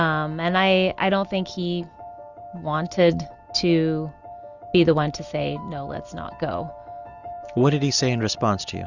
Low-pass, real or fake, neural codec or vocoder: 7.2 kHz; real; none